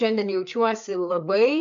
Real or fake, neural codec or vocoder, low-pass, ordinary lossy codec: fake; codec, 16 kHz, 4 kbps, FreqCodec, larger model; 7.2 kHz; MP3, 64 kbps